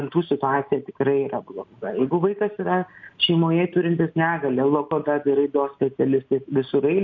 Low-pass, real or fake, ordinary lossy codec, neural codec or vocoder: 7.2 kHz; real; MP3, 48 kbps; none